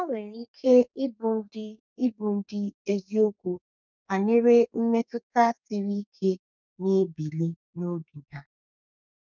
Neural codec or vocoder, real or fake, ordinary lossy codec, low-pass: codec, 32 kHz, 1.9 kbps, SNAC; fake; none; 7.2 kHz